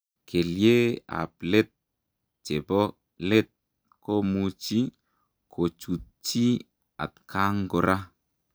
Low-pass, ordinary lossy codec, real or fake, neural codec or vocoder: none; none; real; none